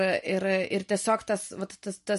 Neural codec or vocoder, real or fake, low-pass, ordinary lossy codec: none; real; 14.4 kHz; MP3, 48 kbps